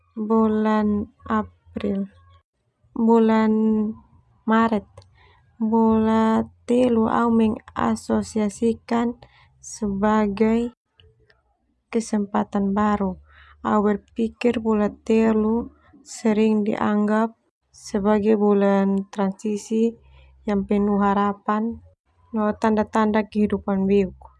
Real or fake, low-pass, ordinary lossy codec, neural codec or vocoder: real; none; none; none